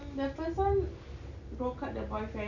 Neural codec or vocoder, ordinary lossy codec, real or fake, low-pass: none; none; real; 7.2 kHz